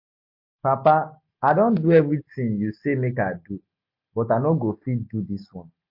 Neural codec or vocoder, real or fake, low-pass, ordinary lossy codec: none; real; 5.4 kHz; MP3, 32 kbps